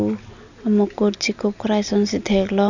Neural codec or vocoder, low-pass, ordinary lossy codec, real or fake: none; 7.2 kHz; none; real